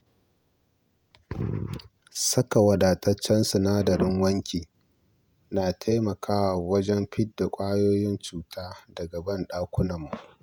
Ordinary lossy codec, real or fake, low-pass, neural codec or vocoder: none; fake; none; vocoder, 48 kHz, 128 mel bands, Vocos